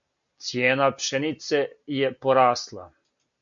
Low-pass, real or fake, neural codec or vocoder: 7.2 kHz; real; none